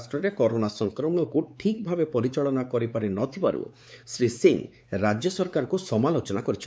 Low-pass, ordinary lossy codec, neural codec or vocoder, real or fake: none; none; codec, 16 kHz, 4 kbps, X-Codec, WavLM features, trained on Multilingual LibriSpeech; fake